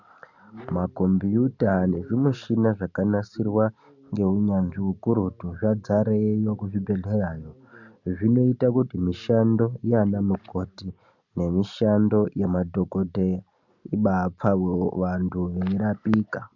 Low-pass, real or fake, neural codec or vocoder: 7.2 kHz; real; none